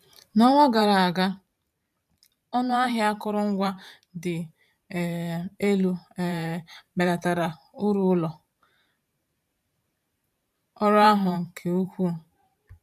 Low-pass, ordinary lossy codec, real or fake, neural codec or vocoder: 14.4 kHz; none; fake; vocoder, 44.1 kHz, 128 mel bands every 512 samples, BigVGAN v2